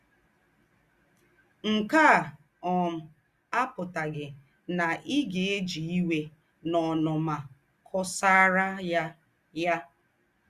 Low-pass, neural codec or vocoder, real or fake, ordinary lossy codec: 14.4 kHz; none; real; Opus, 64 kbps